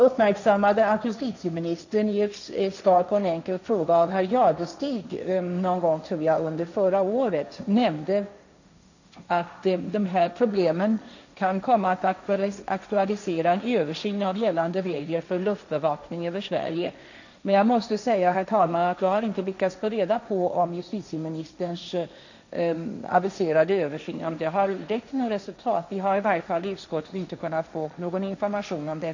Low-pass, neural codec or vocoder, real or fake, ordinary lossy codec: 7.2 kHz; codec, 16 kHz, 1.1 kbps, Voila-Tokenizer; fake; none